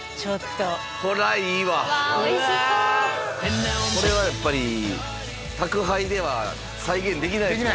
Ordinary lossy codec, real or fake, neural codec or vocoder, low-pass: none; real; none; none